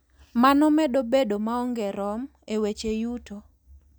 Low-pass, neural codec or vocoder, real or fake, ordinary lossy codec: none; none; real; none